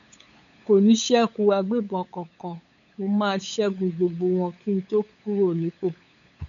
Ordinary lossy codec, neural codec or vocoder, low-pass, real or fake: none; codec, 16 kHz, 8 kbps, FunCodec, trained on LibriTTS, 25 frames a second; 7.2 kHz; fake